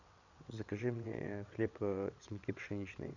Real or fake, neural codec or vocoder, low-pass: fake; vocoder, 22.05 kHz, 80 mel bands, WaveNeXt; 7.2 kHz